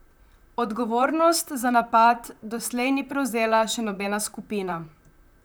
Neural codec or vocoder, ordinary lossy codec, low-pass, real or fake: vocoder, 44.1 kHz, 128 mel bands, Pupu-Vocoder; none; none; fake